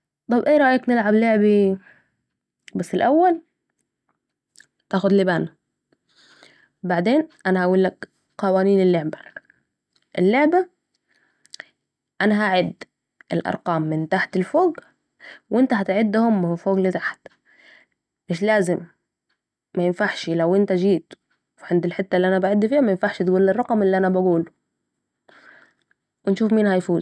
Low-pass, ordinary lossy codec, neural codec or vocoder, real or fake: none; none; none; real